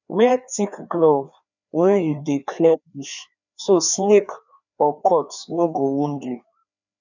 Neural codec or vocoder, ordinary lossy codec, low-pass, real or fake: codec, 16 kHz, 2 kbps, FreqCodec, larger model; none; 7.2 kHz; fake